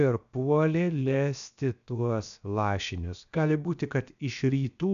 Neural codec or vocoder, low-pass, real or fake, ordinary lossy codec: codec, 16 kHz, 0.7 kbps, FocalCodec; 7.2 kHz; fake; MP3, 96 kbps